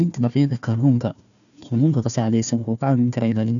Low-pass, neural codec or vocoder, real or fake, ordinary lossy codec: 7.2 kHz; codec, 16 kHz, 1 kbps, FunCodec, trained on Chinese and English, 50 frames a second; fake; none